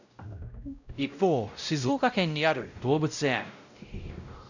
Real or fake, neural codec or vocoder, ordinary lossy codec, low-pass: fake; codec, 16 kHz, 0.5 kbps, X-Codec, WavLM features, trained on Multilingual LibriSpeech; none; 7.2 kHz